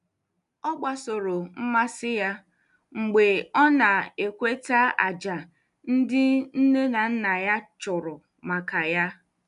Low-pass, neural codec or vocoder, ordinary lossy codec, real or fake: 10.8 kHz; none; none; real